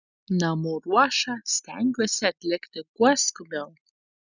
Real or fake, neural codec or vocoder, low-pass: real; none; 7.2 kHz